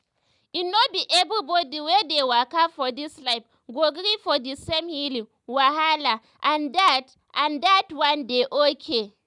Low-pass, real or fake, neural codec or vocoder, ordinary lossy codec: 10.8 kHz; real; none; none